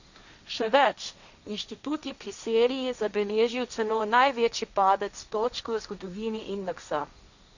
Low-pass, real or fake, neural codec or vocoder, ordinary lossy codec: 7.2 kHz; fake; codec, 16 kHz, 1.1 kbps, Voila-Tokenizer; none